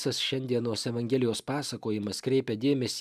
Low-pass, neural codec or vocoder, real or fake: 14.4 kHz; none; real